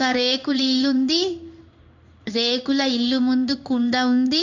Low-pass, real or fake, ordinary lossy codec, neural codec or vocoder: 7.2 kHz; fake; none; codec, 16 kHz in and 24 kHz out, 1 kbps, XY-Tokenizer